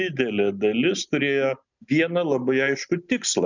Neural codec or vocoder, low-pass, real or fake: none; 7.2 kHz; real